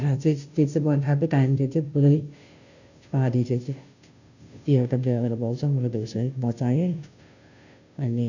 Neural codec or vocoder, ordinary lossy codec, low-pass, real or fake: codec, 16 kHz, 0.5 kbps, FunCodec, trained on Chinese and English, 25 frames a second; none; 7.2 kHz; fake